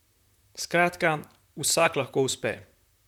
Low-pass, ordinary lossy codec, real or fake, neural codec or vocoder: 19.8 kHz; none; fake; vocoder, 44.1 kHz, 128 mel bands, Pupu-Vocoder